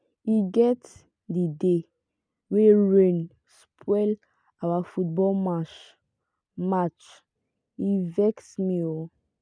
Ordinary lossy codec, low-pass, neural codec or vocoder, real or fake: none; 9.9 kHz; none; real